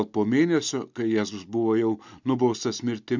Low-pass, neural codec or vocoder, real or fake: 7.2 kHz; none; real